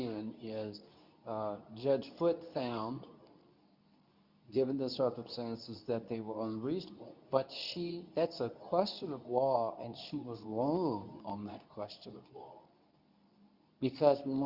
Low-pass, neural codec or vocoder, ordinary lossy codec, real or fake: 5.4 kHz; codec, 24 kHz, 0.9 kbps, WavTokenizer, medium speech release version 2; Opus, 64 kbps; fake